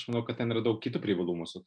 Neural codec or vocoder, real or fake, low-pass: none; real; 9.9 kHz